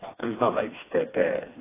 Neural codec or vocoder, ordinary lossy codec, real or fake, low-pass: codec, 16 kHz, 2 kbps, FreqCodec, smaller model; AAC, 16 kbps; fake; 3.6 kHz